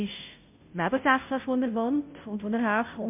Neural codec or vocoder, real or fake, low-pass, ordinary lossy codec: codec, 16 kHz, 0.5 kbps, FunCodec, trained on Chinese and English, 25 frames a second; fake; 3.6 kHz; MP3, 32 kbps